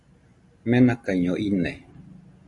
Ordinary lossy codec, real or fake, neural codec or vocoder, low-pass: Opus, 64 kbps; real; none; 10.8 kHz